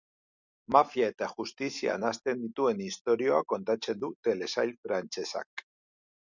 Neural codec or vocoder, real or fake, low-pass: none; real; 7.2 kHz